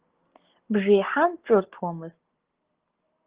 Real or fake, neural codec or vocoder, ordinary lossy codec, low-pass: real; none; Opus, 16 kbps; 3.6 kHz